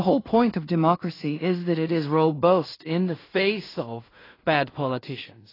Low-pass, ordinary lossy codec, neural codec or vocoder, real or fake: 5.4 kHz; AAC, 24 kbps; codec, 16 kHz in and 24 kHz out, 0.4 kbps, LongCat-Audio-Codec, two codebook decoder; fake